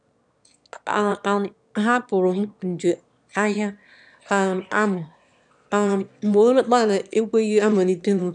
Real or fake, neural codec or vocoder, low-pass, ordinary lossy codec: fake; autoencoder, 22.05 kHz, a latent of 192 numbers a frame, VITS, trained on one speaker; 9.9 kHz; none